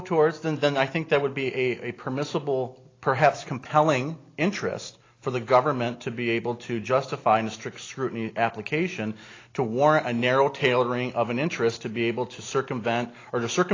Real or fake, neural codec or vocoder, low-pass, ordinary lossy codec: real; none; 7.2 kHz; AAC, 32 kbps